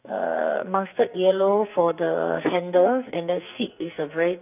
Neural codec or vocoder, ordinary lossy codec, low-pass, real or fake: codec, 44.1 kHz, 2.6 kbps, SNAC; none; 3.6 kHz; fake